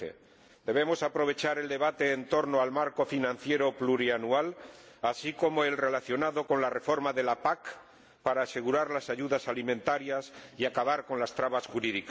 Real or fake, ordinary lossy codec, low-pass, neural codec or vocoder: real; none; none; none